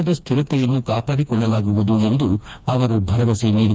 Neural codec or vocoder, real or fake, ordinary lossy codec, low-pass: codec, 16 kHz, 2 kbps, FreqCodec, smaller model; fake; none; none